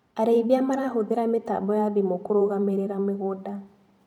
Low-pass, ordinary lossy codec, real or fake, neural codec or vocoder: 19.8 kHz; none; fake; vocoder, 44.1 kHz, 128 mel bands every 512 samples, BigVGAN v2